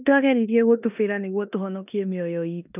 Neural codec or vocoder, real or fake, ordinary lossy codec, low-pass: codec, 16 kHz in and 24 kHz out, 0.9 kbps, LongCat-Audio-Codec, four codebook decoder; fake; none; 3.6 kHz